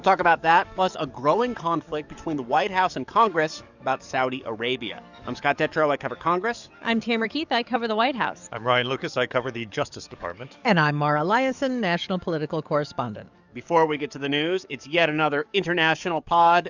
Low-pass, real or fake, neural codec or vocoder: 7.2 kHz; fake; codec, 44.1 kHz, 7.8 kbps, DAC